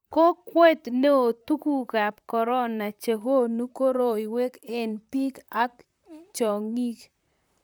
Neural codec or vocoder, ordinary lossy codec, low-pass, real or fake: vocoder, 44.1 kHz, 128 mel bands, Pupu-Vocoder; none; none; fake